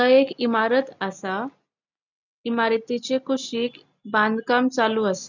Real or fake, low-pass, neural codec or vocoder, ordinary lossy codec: real; 7.2 kHz; none; none